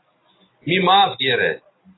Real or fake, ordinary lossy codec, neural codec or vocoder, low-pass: real; AAC, 16 kbps; none; 7.2 kHz